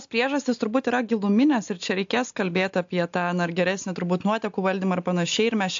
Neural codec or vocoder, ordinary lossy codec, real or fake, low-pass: none; AAC, 64 kbps; real; 7.2 kHz